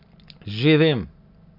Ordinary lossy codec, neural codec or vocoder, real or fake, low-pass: none; none; real; 5.4 kHz